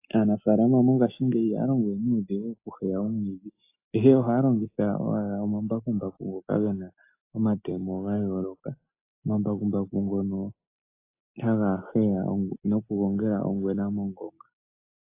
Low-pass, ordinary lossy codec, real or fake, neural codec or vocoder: 3.6 kHz; AAC, 24 kbps; real; none